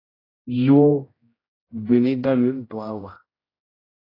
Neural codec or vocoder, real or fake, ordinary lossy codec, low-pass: codec, 16 kHz, 0.5 kbps, X-Codec, HuBERT features, trained on general audio; fake; AAC, 24 kbps; 5.4 kHz